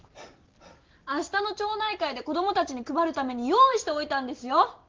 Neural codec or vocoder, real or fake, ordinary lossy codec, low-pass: none; real; Opus, 16 kbps; 7.2 kHz